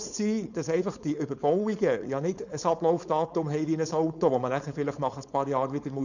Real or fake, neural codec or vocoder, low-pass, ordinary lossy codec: fake; codec, 16 kHz, 4.8 kbps, FACodec; 7.2 kHz; none